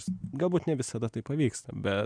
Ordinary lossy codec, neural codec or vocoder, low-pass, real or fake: MP3, 96 kbps; none; 9.9 kHz; real